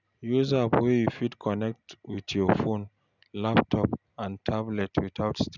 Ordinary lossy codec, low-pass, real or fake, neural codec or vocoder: none; 7.2 kHz; real; none